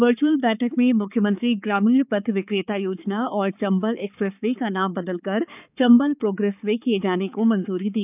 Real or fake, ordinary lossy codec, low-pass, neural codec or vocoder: fake; none; 3.6 kHz; codec, 16 kHz, 4 kbps, X-Codec, HuBERT features, trained on balanced general audio